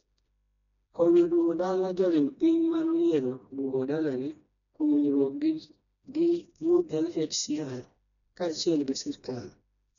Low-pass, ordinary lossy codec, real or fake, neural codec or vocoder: 7.2 kHz; none; fake; codec, 16 kHz, 1 kbps, FreqCodec, smaller model